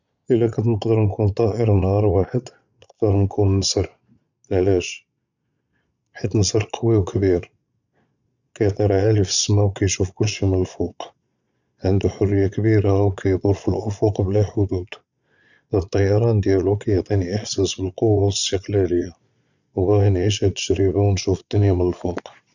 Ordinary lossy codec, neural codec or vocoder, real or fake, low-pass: none; vocoder, 22.05 kHz, 80 mel bands, Vocos; fake; 7.2 kHz